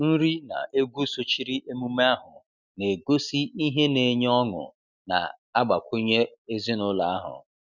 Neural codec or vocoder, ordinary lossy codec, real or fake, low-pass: none; none; real; 7.2 kHz